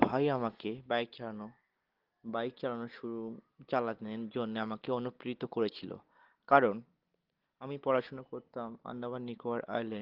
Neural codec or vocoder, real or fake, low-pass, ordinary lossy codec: none; real; 5.4 kHz; Opus, 16 kbps